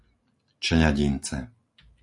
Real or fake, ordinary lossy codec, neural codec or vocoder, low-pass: real; AAC, 48 kbps; none; 10.8 kHz